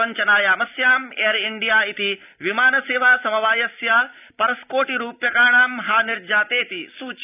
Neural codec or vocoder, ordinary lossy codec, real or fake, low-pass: none; none; real; 3.6 kHz